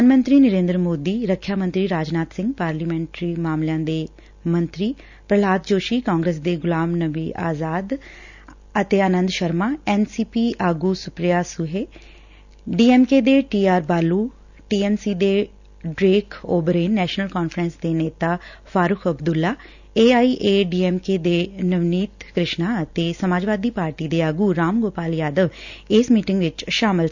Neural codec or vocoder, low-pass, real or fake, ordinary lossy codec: none; 7.2 kHz; real; none